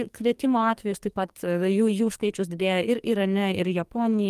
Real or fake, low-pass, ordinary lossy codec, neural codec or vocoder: fake; 14.4 kHz; Opus, 32 kbps; codec, 44.1 kHz, 2.6 kbps, SNAC